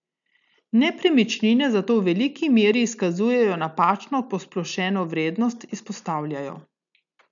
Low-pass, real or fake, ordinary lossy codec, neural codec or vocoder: 7.2 kHz; real; none; none